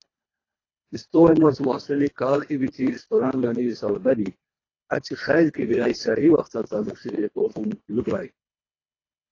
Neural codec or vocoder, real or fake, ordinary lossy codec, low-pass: codec, 24 kHz, 1.5 kbps, HILCodec; fake; AAC, 32 kbps; 7.2 kHz